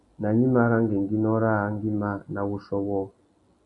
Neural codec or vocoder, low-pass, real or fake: none; 10.8 kHz; real